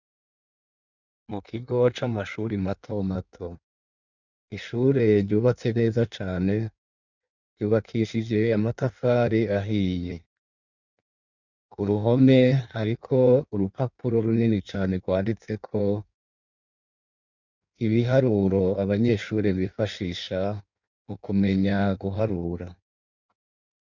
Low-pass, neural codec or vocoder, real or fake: 7.2 kHz; codec, 16 kHz in and 24 kHz out, 1.1 kbps, FireRedTTS-2 codec; fake